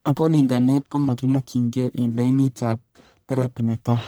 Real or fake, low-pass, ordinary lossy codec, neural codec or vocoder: fake; none; none; codec, 44.1 kHz, 1.7 kbps, Pupu-Codec